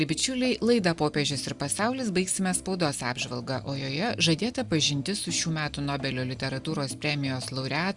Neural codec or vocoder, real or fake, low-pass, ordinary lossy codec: none; real; 10.8 kHz; Opus, 64 kbps